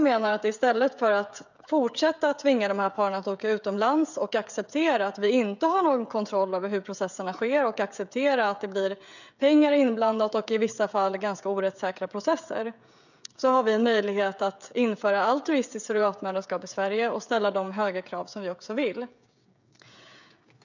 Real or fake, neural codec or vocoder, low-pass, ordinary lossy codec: fake; codec, 16 kHz, 16 kbps, FreqCodec, smaller model; 7.2 kHz; none